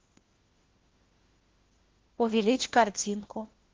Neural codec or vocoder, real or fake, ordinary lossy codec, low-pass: codec, 16 kHz, 1 kbps, FunCodec, trained on LibriTTS, 50 frames a second; fake; Opus, 16 kbps; 7.2 kHz